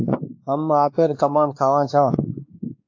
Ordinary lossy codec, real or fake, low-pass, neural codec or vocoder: AAC, 48 kbps; fake; 7.2 kHz; codec, 16 kHz, 2 kbps, X-Codec, WavLM features, trained on Multilingual LibriSpeech